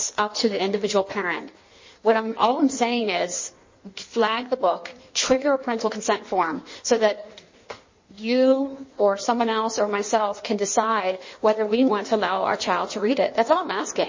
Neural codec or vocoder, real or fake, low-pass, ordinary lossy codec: codec, 16 kHz in and 24 kHz out, 1.1 kbps, FireRedTTS-2 codec; fake; 7.2 kHz; MP3, 32 kbps